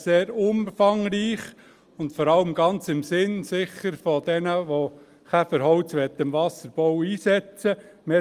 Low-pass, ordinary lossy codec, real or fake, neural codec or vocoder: 14.4 kHz; Opus, 32 kbps; real; none